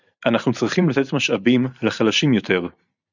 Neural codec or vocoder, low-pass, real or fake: vocoder, 44.1 kHz, 128 mel bands every 512 samples, BigVGAN v2; 7.2 kHz; fake